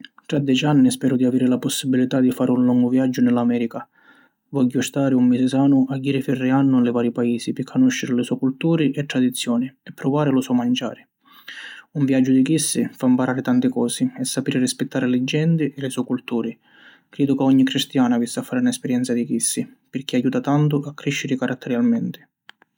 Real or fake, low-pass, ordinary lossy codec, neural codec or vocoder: real; 19.8 kHz; none; none